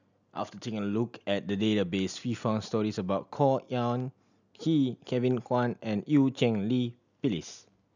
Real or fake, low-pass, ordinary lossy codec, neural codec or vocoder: real; 7.2 kHz; none; none